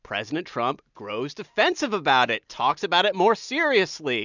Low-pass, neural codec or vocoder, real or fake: 7.2 kHz; none; real